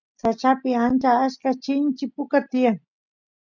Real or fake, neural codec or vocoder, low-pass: real; none; 7.2 kHz